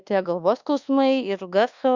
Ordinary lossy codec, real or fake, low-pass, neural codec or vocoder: Opus, 64 kbps; fake; 7.2 kHz; codec, 24 kHz, 1.2 kbps, DualCodec